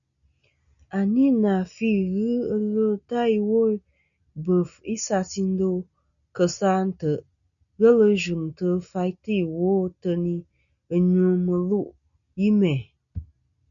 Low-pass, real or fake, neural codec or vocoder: 7.2 kHz; real; none